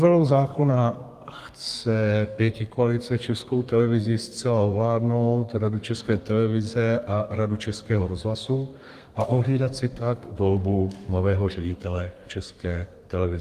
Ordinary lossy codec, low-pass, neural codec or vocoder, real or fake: Opus, 32 kbps; 14.4 kHz; codec, 32 kHz, 1.9 kbps, SNAC; fake